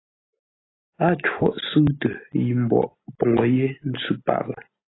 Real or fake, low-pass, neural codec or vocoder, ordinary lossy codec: real; 7.2 kHz; none; AAC, 16 kbps